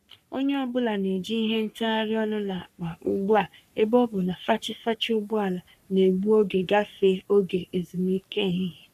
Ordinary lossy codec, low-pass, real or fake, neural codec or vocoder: none; 14.4 kHz; fake; codec, 44.1 kHz, 3.4 kbps, Pupu-Codec